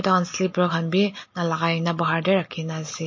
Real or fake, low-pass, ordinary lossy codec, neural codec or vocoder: real; 7.2 kHz; MP3, 32 kbps; none